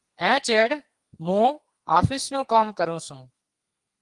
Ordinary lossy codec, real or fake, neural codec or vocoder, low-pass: Opus, 24 kbps; fake; codec, 32 kHz, 1.9 kbps, SNAC; 10.8 kHz